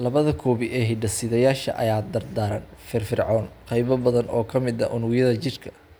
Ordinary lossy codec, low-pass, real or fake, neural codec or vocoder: none; none; real; none